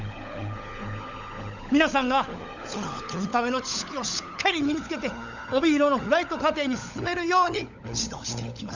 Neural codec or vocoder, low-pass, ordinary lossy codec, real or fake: codec, 16 kHz, 16 kbps, FunCodec, trained on LibriTTS, 50 frames a second; 7.2 kHz; none; fake